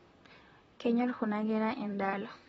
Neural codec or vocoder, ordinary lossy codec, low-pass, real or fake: vocoder, 44.1 kHz, 128 mel bands, Pupu-Vocoder; AAC, 24 kbps; 19.8 kHz; fake